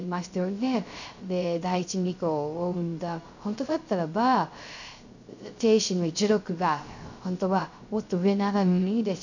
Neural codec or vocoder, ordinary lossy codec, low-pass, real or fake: codec, 16 kHz, 0.3 kbps, FocalCodec; none; 7.2 kHz; fake